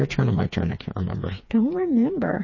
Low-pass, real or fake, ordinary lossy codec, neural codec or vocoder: 7.2 kHz; fake; MP3, 32 kbps; codec, 16 kHz, 4 kbps, FreqCodec, smaller model